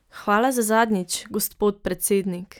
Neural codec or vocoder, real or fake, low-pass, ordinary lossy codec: none; real; none; none